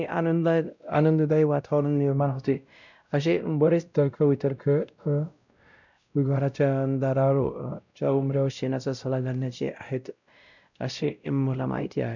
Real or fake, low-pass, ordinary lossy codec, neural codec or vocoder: fake; 7.2 kHz; none; codec, 16 kHz, 0.5 kbps, X-Codec, WavLM features, trained on Multilingual LibriSpeech